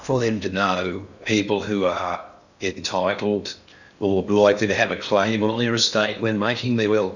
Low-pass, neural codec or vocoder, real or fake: 7.2 kHz; codec, 16 kHz in and 24 kHz out, 0.6 kbps, FocalCodec, streaming, 4096 codes; fake